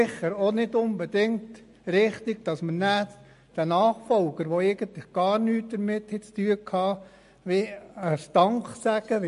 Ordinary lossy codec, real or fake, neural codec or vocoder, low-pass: MP3, 48 kbps; real; none; 10.8 kHz